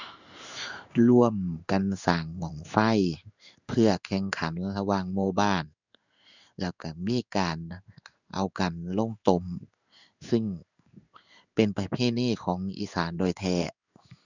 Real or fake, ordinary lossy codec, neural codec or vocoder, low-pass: fake; none; codec, 16 kHz in and 24 kHz out, 1 kbps, XY-Tokenizer; 7.2 kHz